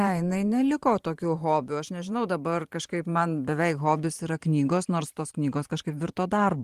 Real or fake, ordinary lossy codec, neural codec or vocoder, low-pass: fake; Opus, 24 kbps; vocoder, 44.1 kHz, 128 mel bands every 512 samples, BigVGAN v2; 14.4 kHz